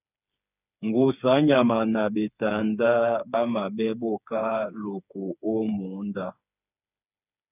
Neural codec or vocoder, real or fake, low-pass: codec, 16 kHz, 4 kbps, FreqCodec, smaller model; fake; 3.6 kHz